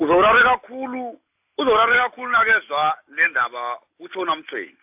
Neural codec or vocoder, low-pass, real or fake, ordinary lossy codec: none; 3.6 kHz; real; AAC, 32 kbps